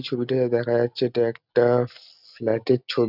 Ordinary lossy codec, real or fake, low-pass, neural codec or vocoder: none; real; 5.4 kHz; none